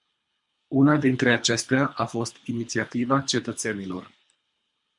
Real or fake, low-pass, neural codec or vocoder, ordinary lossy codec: fake; 10.8 kHz; codec, 24 kHz, 3 kbps, HILCodec; MP3, 64 kbps